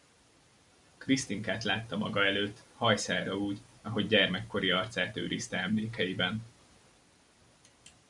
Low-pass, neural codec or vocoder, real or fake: 10.8 kHz; none; real